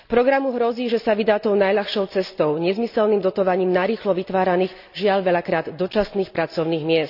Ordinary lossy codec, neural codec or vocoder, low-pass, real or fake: none; none; 5.4 kHz; real